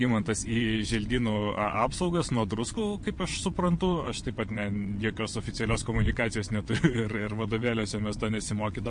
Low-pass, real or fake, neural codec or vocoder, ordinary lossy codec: 9.9 kHz; fake; vocoder, 22.05 kHz, 80 mel bands, WaveNeXt; MP3, 48 kbps